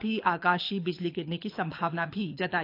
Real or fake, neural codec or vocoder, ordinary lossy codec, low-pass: fake; codec, 24 kHz, 6 kbps, HILCodec; AAC, 32 kbps; 5.4 kHz